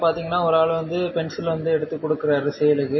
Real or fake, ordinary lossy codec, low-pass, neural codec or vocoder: real; MP3, 24 kbps; 7.2 kHz; none